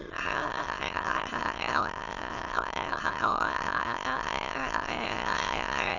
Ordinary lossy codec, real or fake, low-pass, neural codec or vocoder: none; fake; 7.2 kHz; autoencoder, 22.05 kHz, a latent of 192 numbers a frame, VITS, trained on many speakers